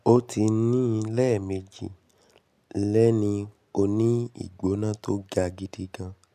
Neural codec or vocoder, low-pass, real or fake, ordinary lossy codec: none; 14.4 kHz; real; none